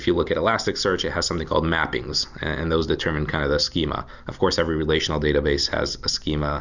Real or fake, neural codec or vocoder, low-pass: real; none; 7.2 kHz